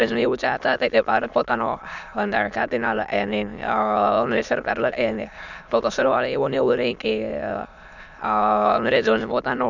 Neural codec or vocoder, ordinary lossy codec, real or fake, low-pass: autoencoder, 22.05 kHz, a latent of 192 numbers a frame, VITS, trained on many speakers; none; fake; 7.2 kHz